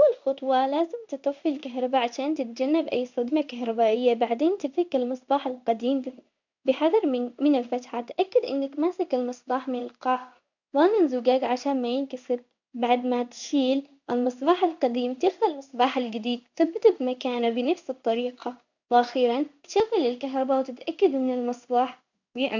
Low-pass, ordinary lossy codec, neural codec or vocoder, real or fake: 7.2 kHz; none; codec, 16 kHz in and 24 kHz out, 1 kbps, XY-Tokenizer; fake